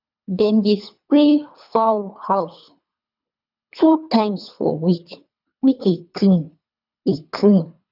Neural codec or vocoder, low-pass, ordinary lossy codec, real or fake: codec, 24 kHz, 3 kbps, HILCodec; 5.4 kHz; none; fake